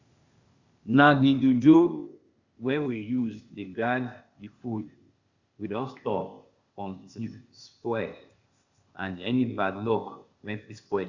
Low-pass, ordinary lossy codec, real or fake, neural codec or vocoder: 7.2 kHz; Opus, 64 kbps; fake; codec, 16 kHz, 0.8 kbps, ZipCodec